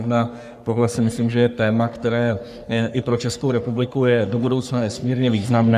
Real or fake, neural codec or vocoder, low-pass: fake; codec, 44.1 kHz, 3.4 kbps, Pupu-Codec; 14.4 kHz